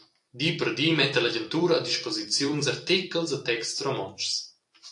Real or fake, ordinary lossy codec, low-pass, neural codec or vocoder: fake; AAC, 64 kbps; 10.8 kHz; vocoder, 44.1 kHz, 128 mel bands every 256 samples, BigVGAN v2